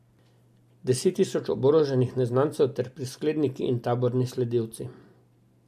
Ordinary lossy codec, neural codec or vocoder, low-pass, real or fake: MP3, 64 kbps; none; 14.4 kHz; real